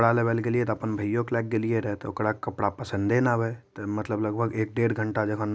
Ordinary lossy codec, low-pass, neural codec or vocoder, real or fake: none; none; none; real